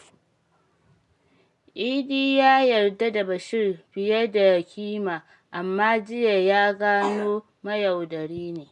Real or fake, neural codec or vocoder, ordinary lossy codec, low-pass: real; none; none; 10.8 kHz